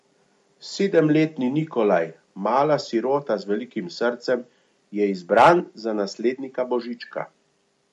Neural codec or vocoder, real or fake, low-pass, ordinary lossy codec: none; real; 14.4 kHz; MP3, 48 kbps